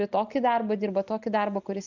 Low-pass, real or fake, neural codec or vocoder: 7.2 kHz; real; none